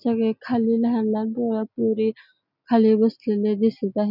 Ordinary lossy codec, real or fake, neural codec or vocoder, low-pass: none; real; none; 5.4 kHz